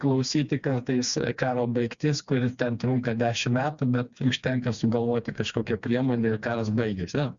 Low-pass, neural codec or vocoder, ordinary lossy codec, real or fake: 7.2 kHz; codec, 16 kHz, 2 kbps, FreqCodec, smaller model; Opus, 64 kbps; fake